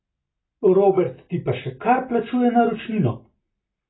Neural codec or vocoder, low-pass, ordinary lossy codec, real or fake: none; 7.2 kHz; AAC, 16 kbps; real